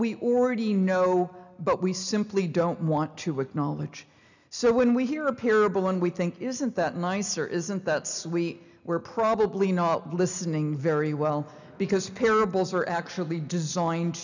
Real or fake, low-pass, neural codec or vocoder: real; 7.2 kHz; none